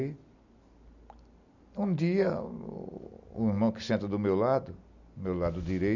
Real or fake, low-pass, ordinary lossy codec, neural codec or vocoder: real; 7.2 kHz; none; none